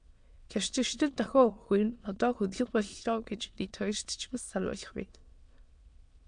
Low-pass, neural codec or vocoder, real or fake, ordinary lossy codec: 9.9 kHz; autoencoder, 22.05 kHz, a latent of 192 numbers a frame, VITS, trained on many speakers; fake; MP3, 64 kbps